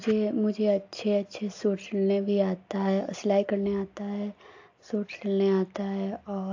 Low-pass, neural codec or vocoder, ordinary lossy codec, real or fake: 7.2 kHz; none; AAC, 48 kbps; real